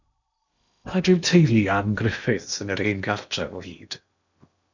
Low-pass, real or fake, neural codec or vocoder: 7.2 kHz; fake; codec, 16 kHz in and 24 kHz out, 0.8 kbps, FocalCodec, streaming, 65536 codes